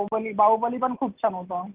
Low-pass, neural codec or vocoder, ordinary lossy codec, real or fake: 3.6 kHz; none; Opus, 16 kbps; real